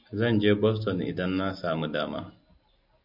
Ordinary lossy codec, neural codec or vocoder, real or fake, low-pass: MP3, 48 kbps; none; real; 5.4 kHz